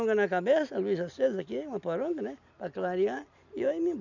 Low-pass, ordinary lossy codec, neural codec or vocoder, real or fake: 7.2 kHz; none; none; real